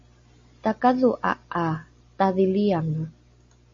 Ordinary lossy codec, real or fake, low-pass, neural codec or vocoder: MP3, 32 kbps; real; 7.2 kHz; none